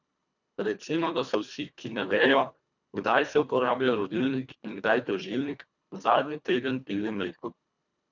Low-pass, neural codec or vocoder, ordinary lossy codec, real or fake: 7.2 kHz; codec, 24 kHz, 1.5 kbps, HILCodec; none; fake